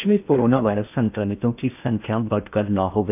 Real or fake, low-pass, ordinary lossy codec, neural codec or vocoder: fake; 3.6 kHz; none; codec, 16 kHz in and 24 kHz out, 0.8 kbps, FocalCodec, streaming, 65536 codes